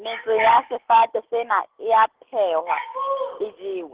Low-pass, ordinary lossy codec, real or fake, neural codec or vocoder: 3.6 kHz; Opus, 16 kbps; real; none